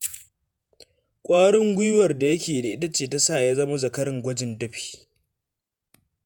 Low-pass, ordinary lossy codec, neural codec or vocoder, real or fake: none; none; vocoder, 48 kHz, 128 mel bands, Vocos; fake